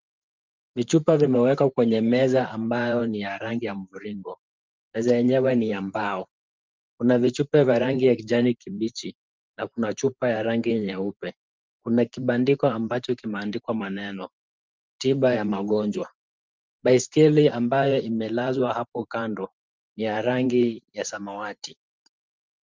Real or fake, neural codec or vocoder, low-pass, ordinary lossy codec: fake; vocoder, 44.1 kHz, 128 mel bands every 512 samples, BigVGAN v2; 7.2 kHz; Opus, 24 kbps